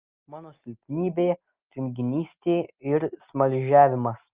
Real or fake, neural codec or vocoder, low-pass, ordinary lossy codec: real; none; 3.6 kHz; Opus, 24 kbps